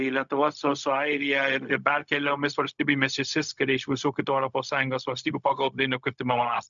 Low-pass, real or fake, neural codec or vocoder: 7.2 kHz; fake; codec, 16 kHz, 0.4 kbps, LongCat-Audio-Codec